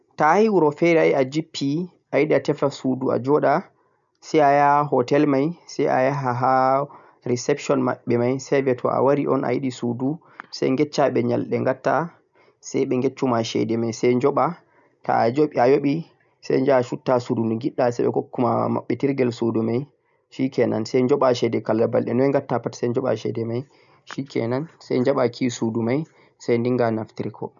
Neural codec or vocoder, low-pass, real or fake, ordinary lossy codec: none; 7.2 kHz; real; none